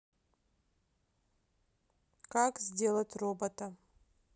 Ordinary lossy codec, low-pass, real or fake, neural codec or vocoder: none; none; real; none